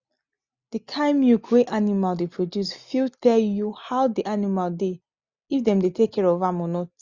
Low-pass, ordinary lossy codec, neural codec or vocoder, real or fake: 7.2 kHz; Opus, 64 kbps; none; real